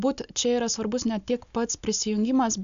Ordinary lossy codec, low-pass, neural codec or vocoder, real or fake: AAC, 96 kbps; 7.2 kHz; none; real